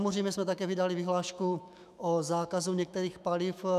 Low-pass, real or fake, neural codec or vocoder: 14.4 kHz; fake; autoencoder, 48 kHz, 128 numbers a frame, DAC-VAE, trained on Japanese speech